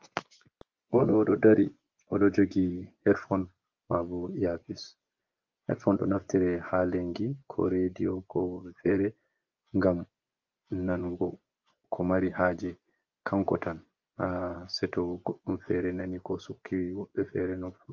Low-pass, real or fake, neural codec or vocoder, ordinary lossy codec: 7.2 kHz; fake; vocoder, 24 kHz, 100 mel bands, Vocos; Opus, 24 kbps